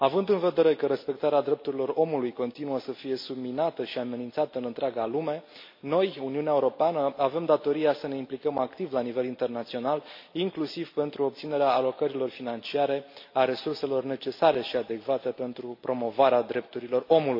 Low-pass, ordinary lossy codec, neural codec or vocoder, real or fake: 5.4 kHz; MP3, 32 kbps; none; real